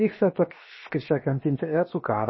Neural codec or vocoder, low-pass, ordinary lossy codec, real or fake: codec, 16 kHz, 0.7 kbps, FocalCodec; 7.2 kHz; MP3, 24 kbps; fake